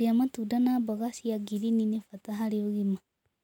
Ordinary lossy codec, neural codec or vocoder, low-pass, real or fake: none; none; 19.8 kHz; real